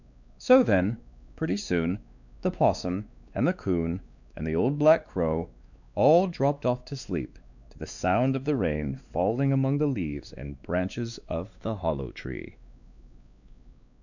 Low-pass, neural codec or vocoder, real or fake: 7.2 kHz; codec, 16 kHz, 2 kbps, X-Codec, WavLM features, trained on Multilingual LibriSpeech; fake